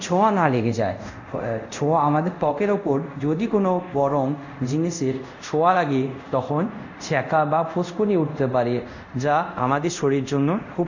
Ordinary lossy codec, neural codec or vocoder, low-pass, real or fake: none; codec, 24 kHz, 0.5 kbps, DualCodec; 7.2 kHz; fake